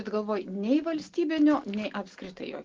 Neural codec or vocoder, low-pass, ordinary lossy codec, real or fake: none; 7.2 kHz; Opus, 16 kbps; real